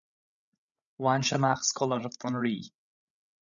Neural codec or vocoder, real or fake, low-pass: codec, 16 kHz, 16 kbps, FreqCodec, larger model; fake; 7.2 kHz